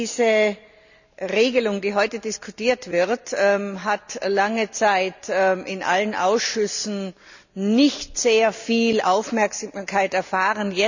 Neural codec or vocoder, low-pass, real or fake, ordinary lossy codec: none; 7.2 kHz; real; none